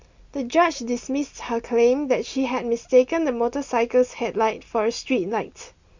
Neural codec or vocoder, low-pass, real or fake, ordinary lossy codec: none; 7.2 kHz; real; Opus, 64 kbps